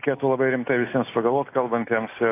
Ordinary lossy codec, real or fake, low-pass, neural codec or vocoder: AAC, 24 kbps; real; 3.6 kHz; none